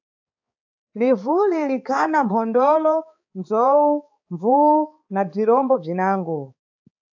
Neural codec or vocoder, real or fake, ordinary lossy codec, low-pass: codec, 16 kHz, 4 kbps, X-Codec, HuBERT features, trained on balanced general audio; fake; AAC, 48 kbps; 7.2 kHz